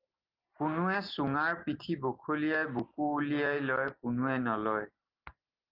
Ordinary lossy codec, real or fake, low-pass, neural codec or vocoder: Opus, 32 kbps; real; 5.4 kHz; none